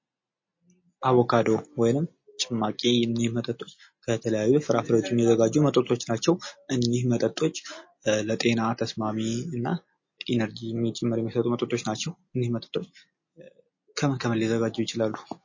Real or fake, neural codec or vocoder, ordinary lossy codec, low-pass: real; none; MP3, 32 kbps; 7.2 kHz